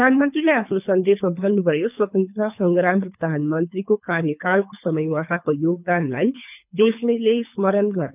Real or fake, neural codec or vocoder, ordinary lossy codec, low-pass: fake; codec, 24 kHz, 3 kbps, HILCodec; none; 3.6 kHz